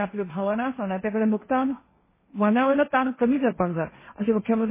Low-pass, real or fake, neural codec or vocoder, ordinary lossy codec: 3.6 kHz; fake; codec, 16 kHz, 1.1 kbps, Voila-Tokenizer; MP3, 16 kbps